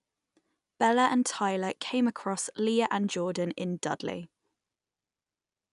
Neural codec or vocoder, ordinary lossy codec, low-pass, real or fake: none; none; 10.8 kHz; real